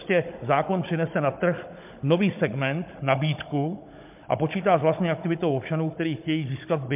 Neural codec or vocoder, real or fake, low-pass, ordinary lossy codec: codec, 16 kHz, 16 kbps, FunCodec, trained on Chinese and English, 50 frames a second; fake; 3.6 kHz; MP3, 32 kbps